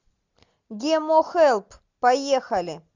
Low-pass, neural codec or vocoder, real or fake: 7.2 kHz; none; real